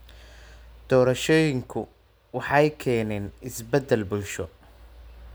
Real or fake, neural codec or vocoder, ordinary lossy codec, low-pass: real; none; none; none